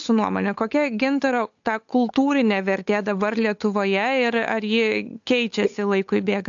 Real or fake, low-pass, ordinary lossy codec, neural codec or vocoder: fake; 7.2 kHz; AAC, 64 kbps; codec, 16 kHz, 8 kbps, FunCodec, trained on Chinese and English, 25 frames a second